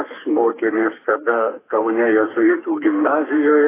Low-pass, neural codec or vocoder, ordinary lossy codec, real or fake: 3.6 kHz; codec, 32 kHz, 1.9 kbps, SNAC; AAC, 16 kbps; fake